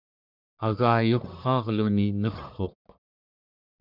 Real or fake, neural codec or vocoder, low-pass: fake; codec, 44.1 kHz, 1.7 kbps, Pupu-Codec; 5.4 kHz